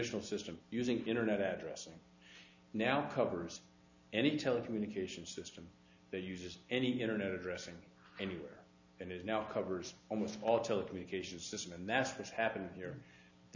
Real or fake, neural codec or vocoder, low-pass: real; none; 7.2 kHz